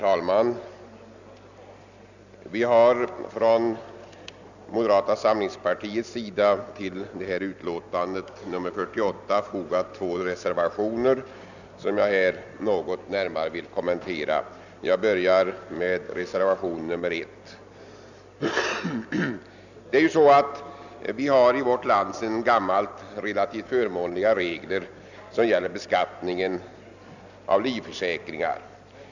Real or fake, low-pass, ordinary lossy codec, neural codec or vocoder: real; 7.2 kHz; none; none